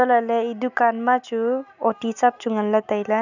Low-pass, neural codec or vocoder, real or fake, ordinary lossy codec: 7.2 kHz; none; real; none